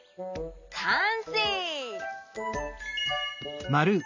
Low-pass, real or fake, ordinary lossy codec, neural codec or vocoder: 7.2 kHz; real; none; none